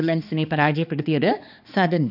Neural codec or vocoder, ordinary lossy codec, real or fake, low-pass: codec, 16 kHz, 2 kbps, X-Codec, HuBERT features, trained on balanced general audio; none; fake; 5.4 kHz